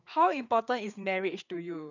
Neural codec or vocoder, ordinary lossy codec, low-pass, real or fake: codec, 16 kHz, 4 kbps, FreqCodec, larger model; none; 7.2 kHz; fake